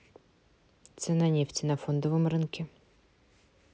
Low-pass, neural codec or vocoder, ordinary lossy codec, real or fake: none; none; none; real